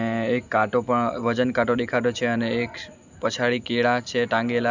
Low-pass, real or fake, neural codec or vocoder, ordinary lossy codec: 7.2 kHz; real; none; none